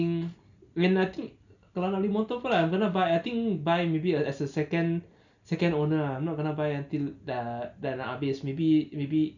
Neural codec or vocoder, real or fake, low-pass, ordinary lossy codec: none; real; 7.2 kHz; none